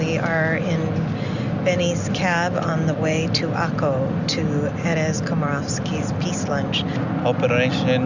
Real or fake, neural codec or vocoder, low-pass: real; none; 7.2 kHz